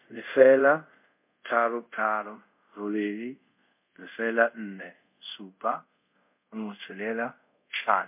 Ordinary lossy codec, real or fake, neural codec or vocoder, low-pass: MP3, 32 kbps; fake; codec, 24 kHz, 0.5 kbps, DualCodec; 3.6 kHz